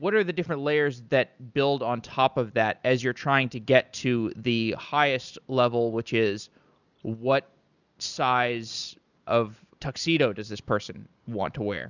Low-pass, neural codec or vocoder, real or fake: 7.2 kHz; none; real